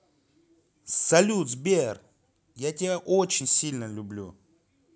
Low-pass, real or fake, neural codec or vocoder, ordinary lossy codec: none; real; none; none